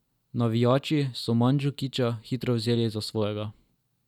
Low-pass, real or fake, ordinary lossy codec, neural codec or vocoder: 19.8 kHz; real; none; none